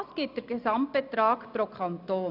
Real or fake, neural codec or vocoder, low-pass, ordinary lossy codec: real; none; 5.4 kHz; none